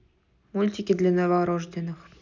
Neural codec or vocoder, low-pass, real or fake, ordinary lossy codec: none; 7.2 kHz; real; none